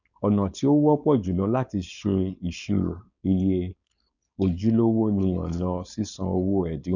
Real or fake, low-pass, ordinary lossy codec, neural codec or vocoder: fake; 7.2 kHz; none; codec, 16 kHz, 4.8 kbps, FACodec